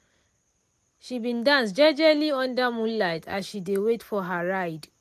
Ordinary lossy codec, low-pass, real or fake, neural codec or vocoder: MP3, 64 kbps; 14.4 kHz; real; none